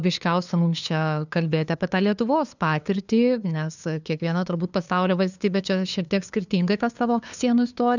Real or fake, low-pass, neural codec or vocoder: fake; 7.2 kHz; codec, 16 kHz, 2 kbps, FunCodec, trained on LibriTTS, 25 frames a second